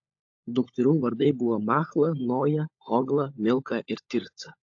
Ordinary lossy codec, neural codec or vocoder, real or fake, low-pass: AAC, 48 kbps; codec, 16 kHz, 16 kbps, FunCodec, trained on LibriTTS, 50 frames a second; fake; 7.2 kHz